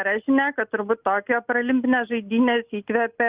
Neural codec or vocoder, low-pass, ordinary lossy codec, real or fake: none; 3.6 kHz; Opus, 32 kbps; real